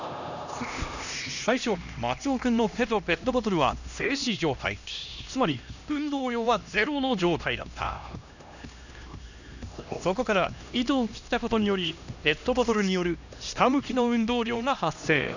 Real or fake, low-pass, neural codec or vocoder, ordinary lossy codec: fake; 7.2 kHz; codec, 16 kHz, 1 kbps, X-Codec, HuBERT features, trained on LibriSpeech; none